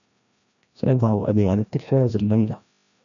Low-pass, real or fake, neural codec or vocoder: 7.2 kHz; fake; codec, 16 kHz, 1 kbps, FreqCodec, larger model